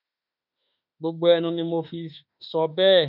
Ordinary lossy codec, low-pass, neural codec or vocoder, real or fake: none; 5.4 kHz; autoencoder, 48 kHz, 32 numbers a frame, DAC-VAE, trained on Japanese speech; fake